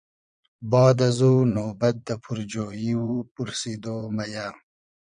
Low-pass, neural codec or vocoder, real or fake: 9.9 kHz; vocoder, 22.05 kHz, 80 mel bands, Vocos; fake